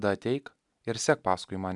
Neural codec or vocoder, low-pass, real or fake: none; 10.8 kHz; real